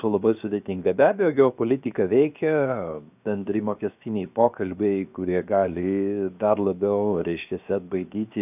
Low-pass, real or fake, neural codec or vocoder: 3.6 kHz; fake; codec, 16 kHz, 0.7 kbps, FocalCodec